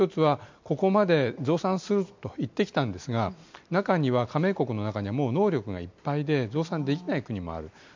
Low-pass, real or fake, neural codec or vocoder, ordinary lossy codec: 7.2 kHz; real; none; MP3, 64 kbps